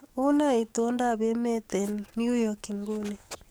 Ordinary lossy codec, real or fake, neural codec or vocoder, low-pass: none; fake; codec, 44.1 kHz, 7.8 kbps, DAC; none